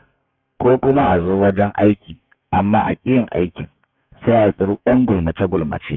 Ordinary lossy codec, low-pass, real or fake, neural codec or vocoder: none; 7.2 kHz; fake; codec, 32 kHz, 1.9 kbps, SNAC